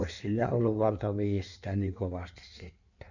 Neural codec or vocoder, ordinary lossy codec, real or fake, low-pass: codec, 16 kHz in and 24 kHz out, 1.1 kbps, FireRedTTS-2 codec; none; fake; 7.2 kHz